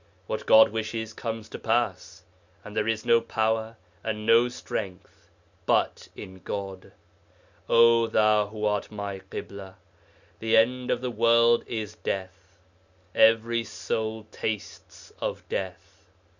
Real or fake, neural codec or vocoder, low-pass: real; none; 7.2 kHz